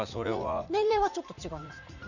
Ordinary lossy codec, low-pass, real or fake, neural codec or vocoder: none; 7.2 kHz; fake; vocoder, 44.1 kHz, 80 mel bands, Vocos